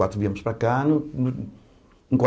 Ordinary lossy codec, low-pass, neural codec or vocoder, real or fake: none; none; none; real